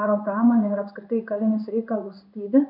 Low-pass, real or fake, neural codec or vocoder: 5.4 kHz; fake; codec, 16 kHz in and 24 kHz out, 1 kbps, XY-Tokenizer